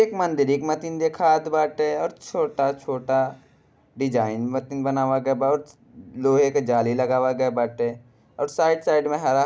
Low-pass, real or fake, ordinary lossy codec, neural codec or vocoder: none; real; none; none